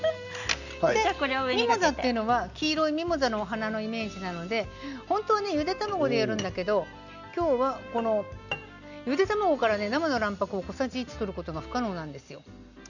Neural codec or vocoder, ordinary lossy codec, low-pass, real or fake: none; none; 7.2 kHz; real